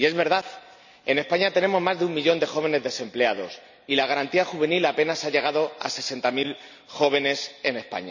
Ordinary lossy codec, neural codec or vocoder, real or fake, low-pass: none; none; real; 7.2 kHz